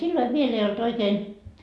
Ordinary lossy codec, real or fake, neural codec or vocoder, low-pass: none; real; none; none